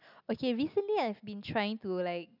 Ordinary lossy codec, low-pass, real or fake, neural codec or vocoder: none; 5.4 kHz; real; none